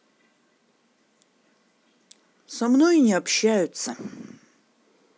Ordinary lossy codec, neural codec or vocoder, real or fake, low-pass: none; none; real; none